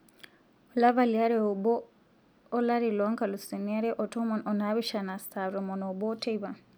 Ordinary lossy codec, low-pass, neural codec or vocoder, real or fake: none; none; none; real